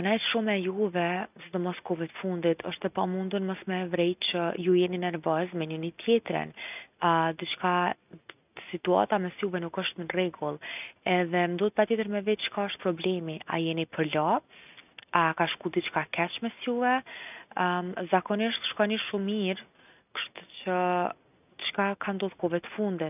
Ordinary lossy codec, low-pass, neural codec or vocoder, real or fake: none; 3.6 kHz; none; real